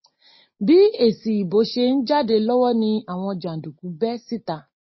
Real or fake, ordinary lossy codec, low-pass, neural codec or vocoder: real; MP3, 24 kbps; 7.2 kHz; none